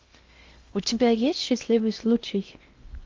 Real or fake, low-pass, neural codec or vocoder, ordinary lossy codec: fake; 7.2 kHz; codec, 16 kHz in and 24 kHz out, 0.6 kbps, FocalCodec, streaming, 2048 codes; Opus, 32 kbps